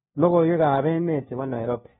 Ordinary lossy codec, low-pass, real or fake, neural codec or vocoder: AAC, 16 kbps; 7.2 kHz; fake; codec, 16 kHz, 1 kbps, FunCodec, trained on LibriTTS, 50 frames a second